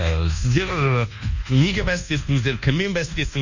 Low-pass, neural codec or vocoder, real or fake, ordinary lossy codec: 7.2 kHz; codec, 24 kHz, 1.2 kbps, DualCodec; fake; none